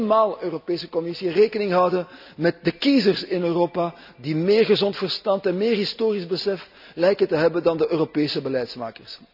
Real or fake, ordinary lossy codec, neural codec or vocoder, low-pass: real; none; none; 5.4 kHz